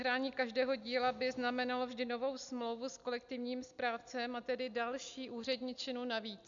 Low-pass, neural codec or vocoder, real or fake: 7.2 kHz; none; real